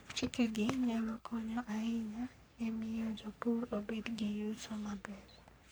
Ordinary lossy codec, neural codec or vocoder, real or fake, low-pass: none; codec, 44.1 kHz, 3.4 kbps, Pupu-Codec; fake; none